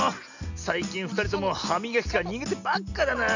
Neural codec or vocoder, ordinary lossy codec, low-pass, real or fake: none; none; 7.2 kHz; real